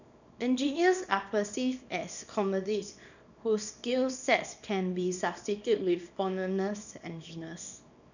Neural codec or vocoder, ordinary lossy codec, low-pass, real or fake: codec, 24 kHz, 0.9 kbps, WavTokenizer, small release; none; 7.2 kHz; fake